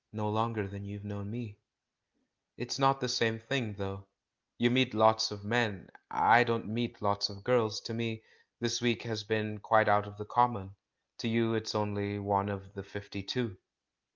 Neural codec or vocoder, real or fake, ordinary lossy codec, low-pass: none; real; Opus, 24 kbps; 7.2 kHz